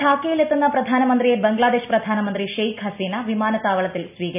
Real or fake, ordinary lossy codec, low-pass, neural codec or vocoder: real; none; 3.6 kHz; none